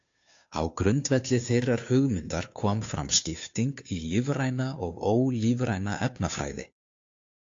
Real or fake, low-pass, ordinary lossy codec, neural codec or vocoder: fake; 7.2 kHz; AAC, 48 kbps; codec, 16 kHz, 2 kbps, FunCodec, trained on Chinese and English, 25 frames a second